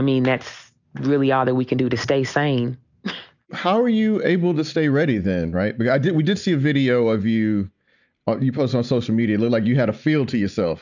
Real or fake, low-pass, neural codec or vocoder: real; 7.2 kHz; none